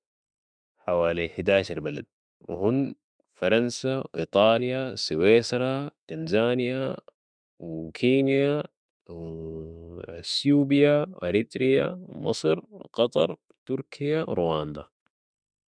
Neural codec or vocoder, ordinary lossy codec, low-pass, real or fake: autoencoder, 48 kHz, 32 numbers a frame, DAC-VAE, trained on Japanese speech; none; 9.9 kHz; fake